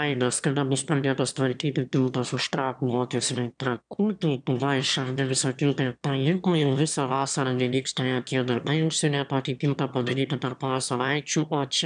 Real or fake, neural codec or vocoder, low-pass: fake; autoencoder, 22.05 kHz, a latent of 192 numbers a frame, VITS, trained on one speaker; 9.9 kHz